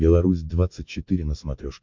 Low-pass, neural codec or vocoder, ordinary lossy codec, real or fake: 7.2 kHz; none; MP3, 64 kbps; real